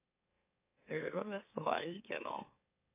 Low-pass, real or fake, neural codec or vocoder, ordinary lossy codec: 3.6 kHz; fake; autoencoder, 44.1 kHz, a latent of 192 numbers a frame, MeloTTS; AAC, 32 kbps